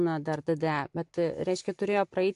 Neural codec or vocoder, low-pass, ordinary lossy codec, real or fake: none; 10.8 kHz; AAC, 64 kbps; real